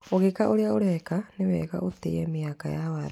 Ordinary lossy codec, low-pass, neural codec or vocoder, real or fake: none; 19.8 kHz; none; real